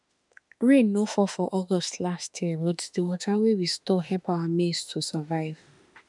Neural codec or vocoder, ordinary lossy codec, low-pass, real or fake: autoencoder, 48 kHz, 32 numbers a frame, DAC-VAE, trained on Japanese speech; none; 10.8 kHz; fake